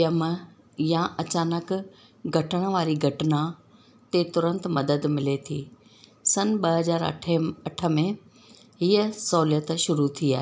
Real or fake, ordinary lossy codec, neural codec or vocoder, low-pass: real; none; none; none